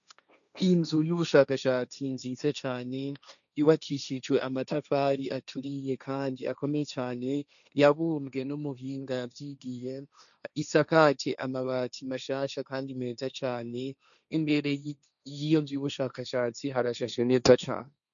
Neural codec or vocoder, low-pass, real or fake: codec, 16 kHz, 1.1 kbps, Voila-Tokenizer; 7.2 kHz; fake